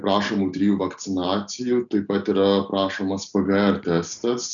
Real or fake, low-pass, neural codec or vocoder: real; 7.2 kHz; none